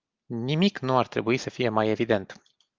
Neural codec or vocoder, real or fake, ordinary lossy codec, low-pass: none; real; Opus, 32 kbps; 7.2 kHz